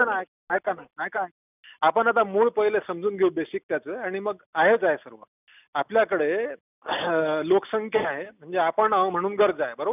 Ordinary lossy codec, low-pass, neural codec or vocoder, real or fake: none; 3.6 kHz; none; real